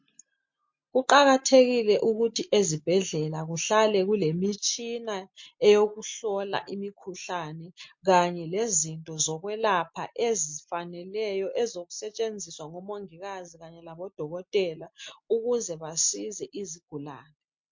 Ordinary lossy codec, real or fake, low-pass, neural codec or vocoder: MP3, 48 kbps; real; 7.2 kHz; none